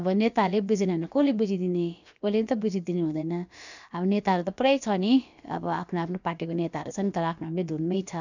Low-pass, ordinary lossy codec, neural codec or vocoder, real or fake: 7.2 kHz; none; codec, 16 kHz, about 1 kbps, DyCAST, with the encoder's durations; fake